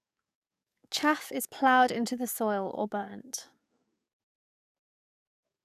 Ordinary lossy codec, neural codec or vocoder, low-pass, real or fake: none; codec, 44.1 kHz, 7.8 kbps, DAC; 14.4 kHz; fake